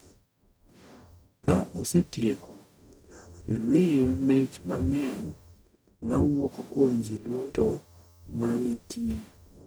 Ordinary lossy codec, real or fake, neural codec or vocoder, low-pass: none; fake; codec, 44.1 kHz, 0.9 kbps, DAC; none